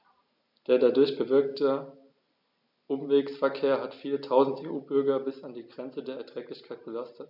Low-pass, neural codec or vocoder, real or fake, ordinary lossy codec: 5.4 kHz; none; real; none